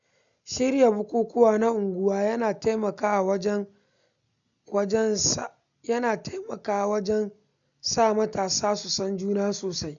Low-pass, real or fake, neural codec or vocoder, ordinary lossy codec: 7.2 kHz; real; none; none